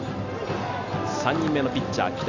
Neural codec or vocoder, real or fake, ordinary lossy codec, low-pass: none; real; none; 7.2 kHz